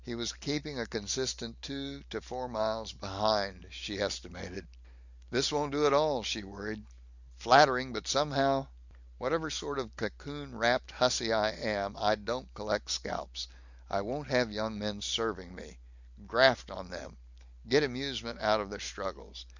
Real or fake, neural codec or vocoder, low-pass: real; none; 7.2 kHz